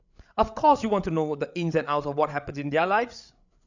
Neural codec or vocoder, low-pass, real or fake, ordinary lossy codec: codec, 16 kHz, 8 kbps, FreqCodec, larger model; 7.2 kHz; fake; none